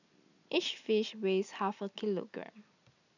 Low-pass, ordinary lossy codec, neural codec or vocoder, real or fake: 7.2 kHz; none; none; real